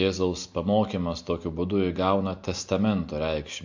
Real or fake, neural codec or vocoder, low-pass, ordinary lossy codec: real; none; 7.2 kHz; MP3, 48 kbps